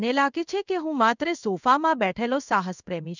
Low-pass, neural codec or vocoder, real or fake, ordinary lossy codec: 7.2 kHz; codec, 16 kHz in and 24 kHz out, 1 kbps, XY-Tokenizer; fake; none